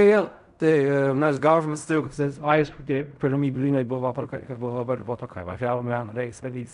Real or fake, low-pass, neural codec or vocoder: fake; 10.8 kHz; codec, 16 kHz in and 24 kHz out, 0.4 kbps, LongCat-Audio-Codec, fine tuned four codebook decoder